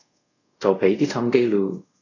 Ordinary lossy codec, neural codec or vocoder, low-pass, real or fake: AAC, 32 kbps; codec, 24 kHz, 0.5 kbps, DualCodec; 7.2 kHz; fake